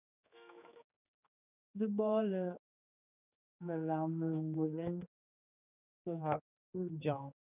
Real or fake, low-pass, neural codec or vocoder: fake; 3.6 kHz; codec, 16 kHz, 4 kbps, X-Codec, HuBERT features, trained on general audio